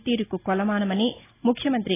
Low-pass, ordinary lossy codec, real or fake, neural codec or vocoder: 3.6 kHz; AAC, 16 kbps; real; none